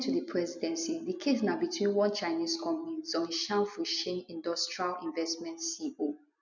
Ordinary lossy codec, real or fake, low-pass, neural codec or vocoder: none; real; 7.2 kHz; none